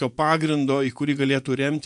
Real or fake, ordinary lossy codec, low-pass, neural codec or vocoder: fake; AAC, 96 kbps; 10.8 kHz; vocoder, 24 kHz, 100 mel bands, Vocos